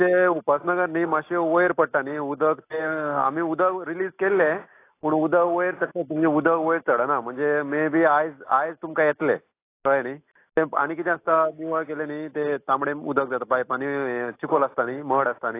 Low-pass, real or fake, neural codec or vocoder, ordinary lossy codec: 3.6 kHz; real; none; AAC, 24 kbps